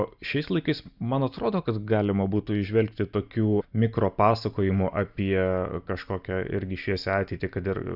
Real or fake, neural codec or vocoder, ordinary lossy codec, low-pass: real; none; Opus, 64 kbps; 5.4 kHz